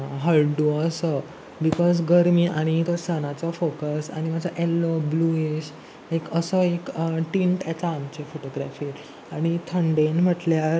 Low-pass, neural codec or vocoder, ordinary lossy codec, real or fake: none; none; none; real